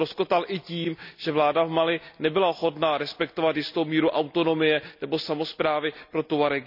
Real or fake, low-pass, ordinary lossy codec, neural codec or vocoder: real; 5.4 kHz; none; none